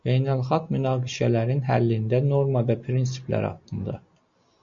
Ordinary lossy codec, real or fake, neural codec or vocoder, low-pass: MP3, 48 kbps; real; none; 7.2 kHz